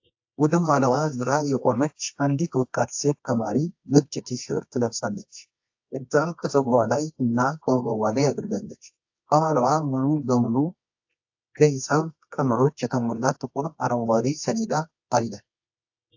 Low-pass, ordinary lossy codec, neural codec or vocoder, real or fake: 7.2 kHz; AAC, 48 kbps; codec, 24 kHz, 0.9 kbps, WavTokenizer, medium music audio release; fake